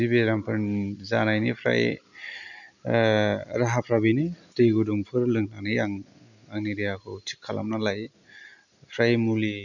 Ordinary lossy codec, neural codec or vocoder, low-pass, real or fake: none; none; 7.2 kHz; real